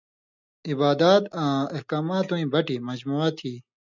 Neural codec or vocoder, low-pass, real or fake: none; 7.2 kHz; real